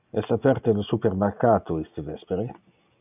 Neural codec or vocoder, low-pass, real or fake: none; 3.6 kHz; real